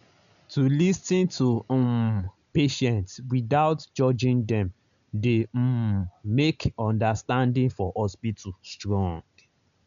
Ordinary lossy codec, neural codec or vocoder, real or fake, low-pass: none; none; real; 7.2 kHz